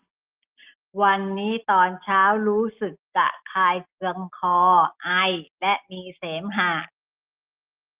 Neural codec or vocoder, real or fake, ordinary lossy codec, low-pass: none; real; Opus, 32 kbps; 3.6 kHz